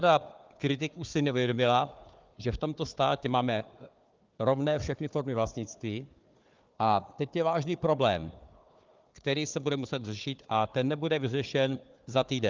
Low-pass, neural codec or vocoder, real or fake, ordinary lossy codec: 7.2 kHz; codec, 16 kHz, 4 kbps, FunCodec, trained on Chinese and English, 50 frames a second; fake; Opus, 32 kbps